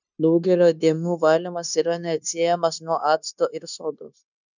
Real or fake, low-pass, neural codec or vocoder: fake; 7.2 kHz; codec, 16 kHz, 0.9 kbps, LongCat-Audio-Codec